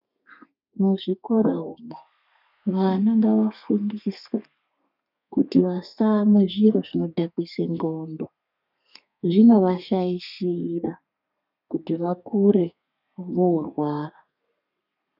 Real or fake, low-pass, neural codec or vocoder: fake; 5.4 kHz; codec, 32 kHz, 1.9 kbps, SNAC